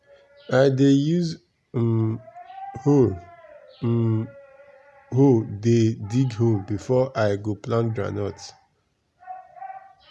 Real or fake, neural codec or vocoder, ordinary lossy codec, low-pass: real; none; none; none